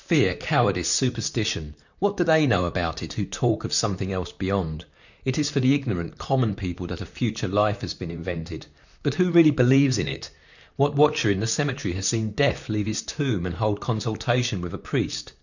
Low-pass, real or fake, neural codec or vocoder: 7.2 kHz; fake; vocoder, 44.1 kHz, 128 mel bands, Pupu-Vocoder